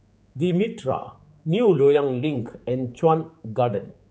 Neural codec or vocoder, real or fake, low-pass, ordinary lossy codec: codec, 16 kHz, 4 kbps, X-Codec, HuBERT features, trained on general audio; fake; none; none